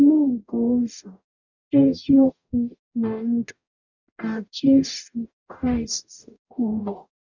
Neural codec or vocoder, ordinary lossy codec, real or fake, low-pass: codec, 44.1 kHz, 0.9 kbps, DAC; none; fake; 7.2 kHz